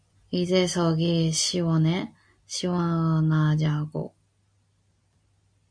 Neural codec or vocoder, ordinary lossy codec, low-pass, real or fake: none; MP3, 48 kbps; 9.9 kHz; real